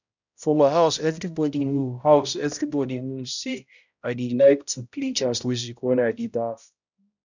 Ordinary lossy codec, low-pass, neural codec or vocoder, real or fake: none; 7.2 kHz; codec, 16 kHz, 0.5 kbps, X-Codec, HuBERT features, trained on balanced general audio; fake